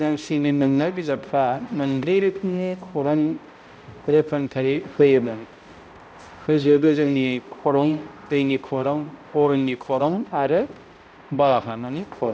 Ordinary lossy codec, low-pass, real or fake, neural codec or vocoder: none; none; fake; codec, 16 kHz, 0.5 kbps, X-Codec, HuBERT features, trained on balanced general audio